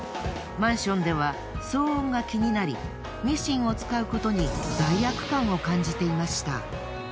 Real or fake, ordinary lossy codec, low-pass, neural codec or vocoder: real; none; none; none